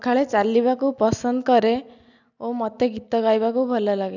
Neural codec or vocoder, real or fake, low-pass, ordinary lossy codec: none; real; 7.2 kHz; none